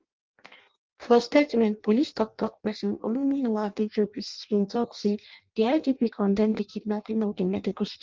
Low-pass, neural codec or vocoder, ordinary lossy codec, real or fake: 7.2 kHz; codec, 16 kHz in and 24 kHz out, 0.6 kbps, FireRedTTS-2 codec; Opus, 32 kbps; fake